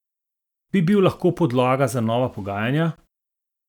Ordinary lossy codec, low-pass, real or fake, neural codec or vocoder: none; 19.8 kHz; real; none